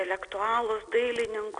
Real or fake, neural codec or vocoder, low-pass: real; none; 9.9 kHz